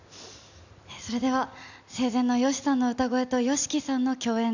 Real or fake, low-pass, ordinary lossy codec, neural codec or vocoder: real; 7.2 kHz; none; none